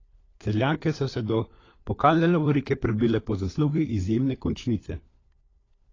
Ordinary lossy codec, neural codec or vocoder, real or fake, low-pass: AAC, 32 kbps; codec, 16 kHz, 4 kbps, FunCodec, trained on LibriTTS, 50 frames a second; fake; 7.2 kHz